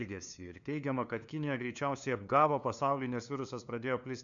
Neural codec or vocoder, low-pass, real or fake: codec, 16 kHz, 2 kbps, FunCodec, trained on LibriTTS, 25 frames a second; 7.2 kHz; fake